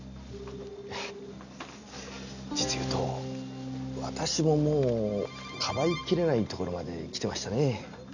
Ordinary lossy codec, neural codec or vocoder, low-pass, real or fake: none; none; 7.2 kHz; real